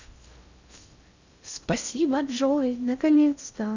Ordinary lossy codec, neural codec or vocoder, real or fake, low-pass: Opus, 64 kbps; codec, 16 kHz in and 24 kHz out, 0.6 kbps, FocalCodec, streaming, 4096 codes; fake; 7.2 kHz